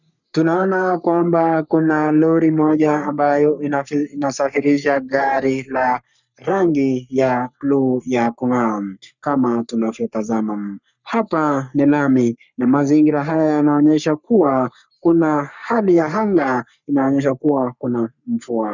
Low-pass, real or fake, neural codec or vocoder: 7.2 kHz; fake; codec, 44.1 kHz, 3.4 kbps, Pupu-Codec